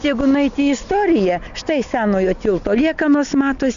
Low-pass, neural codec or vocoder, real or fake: 7.2 kHz; none; real